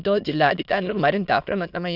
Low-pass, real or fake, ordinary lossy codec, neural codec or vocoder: 5.4 kHz; fake; none; autoencoder, 22.05 kHz, a latent of 192 numbers a frame, VITS, trained on many speakers